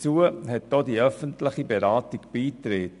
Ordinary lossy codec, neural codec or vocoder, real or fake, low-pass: AAC, 96 kbps; none; real; 10.8 kHz